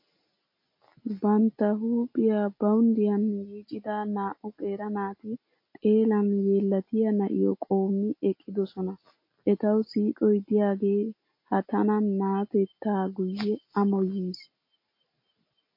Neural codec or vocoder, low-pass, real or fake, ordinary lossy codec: none; 5.4 kHz; real; MP3, 32 kbps